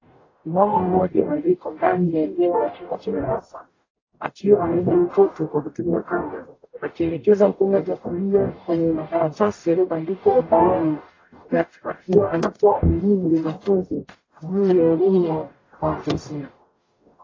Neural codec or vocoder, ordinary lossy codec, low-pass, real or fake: codec, 44.1 kHz, 0.9 kbps, DAC; AAC, 32 kbps; 7.2 kHz; fake